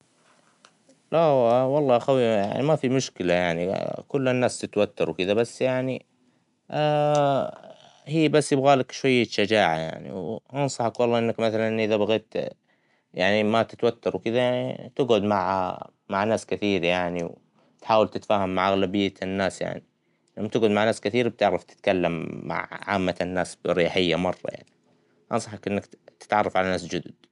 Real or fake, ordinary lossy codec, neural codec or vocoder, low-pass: real; none; none; 10.8 kHz